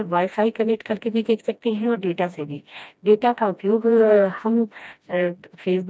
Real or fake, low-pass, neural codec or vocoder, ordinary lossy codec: fake; none; codec, 16 kHz, 1 kbps, FreqCodec, smaller model; none